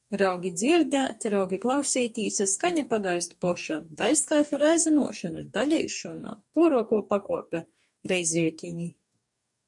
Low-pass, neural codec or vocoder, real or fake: 10.8 kHz; codec, 44.1 kHz, 2.6 kbps, DAC; fake